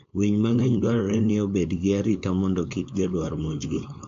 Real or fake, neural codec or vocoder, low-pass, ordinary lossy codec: fake; codec, 16 kHz, 4.8 kbps, FACodec; 7.2 kHz; AAC, 48 kbps